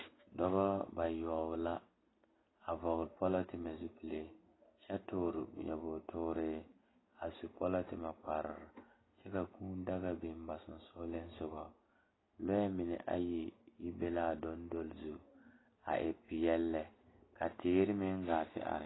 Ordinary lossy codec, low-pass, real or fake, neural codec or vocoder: AAC, 16 kbps; 7.2 kHz; real; none